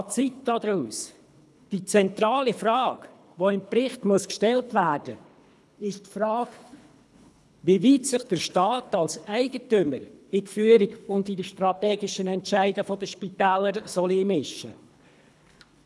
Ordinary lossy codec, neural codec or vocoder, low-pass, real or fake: none; codec, 24 kHz, 3 kbps, HILCodec; none; fake